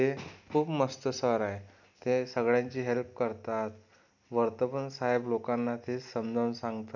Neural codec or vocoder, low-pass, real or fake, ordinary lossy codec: none; 7.2 kHz; real; none